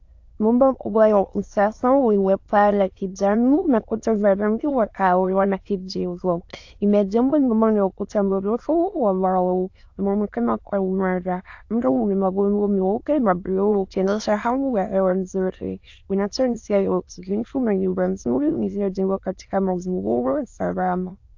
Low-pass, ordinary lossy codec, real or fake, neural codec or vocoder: 7.2 kHz; AAC, 48 kbps; fake; autoencoder, 22.05 kHz, a latent of 192 numbers a frame, VITS, trained on many speakers